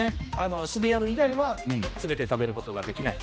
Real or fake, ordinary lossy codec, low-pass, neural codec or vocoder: fake; none; none; codec, 16 kHz, 1 kbps, X-Codec, HuBERT features, trained on general audio